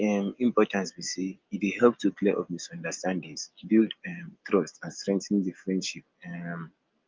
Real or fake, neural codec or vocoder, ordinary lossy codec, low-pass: real; none; Opus, 32 kbps; 7.2 kHz